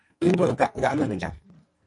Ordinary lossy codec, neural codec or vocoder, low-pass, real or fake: MP3, 48 kbps; codec, 44.1 kHz, 2.6 kbps, SNAC; 10.8 kHz; fake